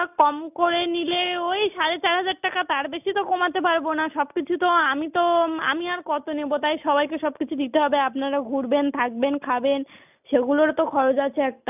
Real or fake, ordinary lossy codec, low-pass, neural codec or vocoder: real; none; 3.6 kHz; none